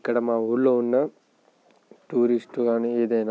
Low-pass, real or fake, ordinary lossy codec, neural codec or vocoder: none; real; none; none